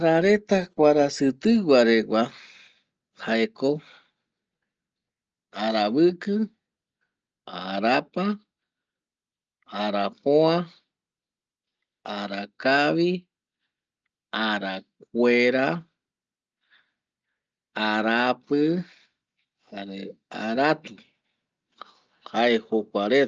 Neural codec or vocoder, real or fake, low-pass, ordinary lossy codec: none; real; 7.2 kHz; Opus, 24 kbps